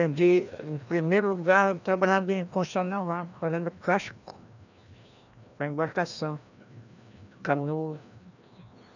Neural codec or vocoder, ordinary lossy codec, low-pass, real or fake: codec, 16 kHz, 1 kbps, FreqCodec, larger model; none; 7.2 kHz; fake